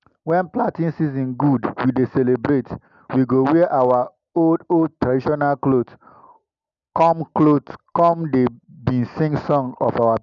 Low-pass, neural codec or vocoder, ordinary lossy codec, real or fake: 7.2 kHz; none; none; real